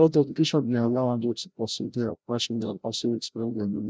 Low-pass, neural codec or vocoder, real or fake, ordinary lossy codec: none; codec, 16 kHz, 0.5 kbps, FreqCodec, larger model; fake; none